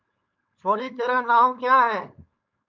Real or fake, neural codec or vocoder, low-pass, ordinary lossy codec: fake; codec, 16 kHz, 4.8 kbps, FACodec; 7.2 kHz; MP3, 64 kbps